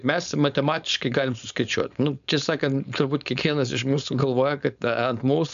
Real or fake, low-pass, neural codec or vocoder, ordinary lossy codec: fake; 7.2 kHz; codec, 16 kHz, 4.8 kbps, FACodec; AAC, 96 kbps